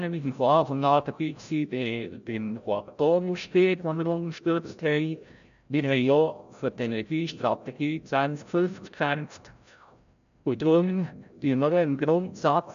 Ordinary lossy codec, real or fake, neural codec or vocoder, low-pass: none; fake; codec, 16 kHz, 0.5 kbps, FreqCodec, larger model; 7.2 kHz